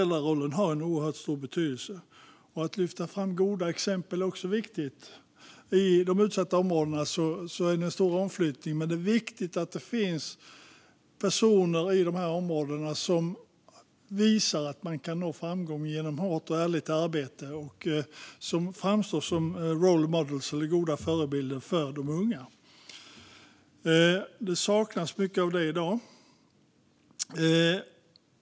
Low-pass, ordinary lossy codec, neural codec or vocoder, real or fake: none; none; none; real